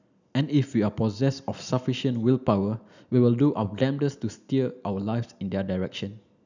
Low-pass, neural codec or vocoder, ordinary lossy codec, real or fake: 7.2 kHz; none; none; real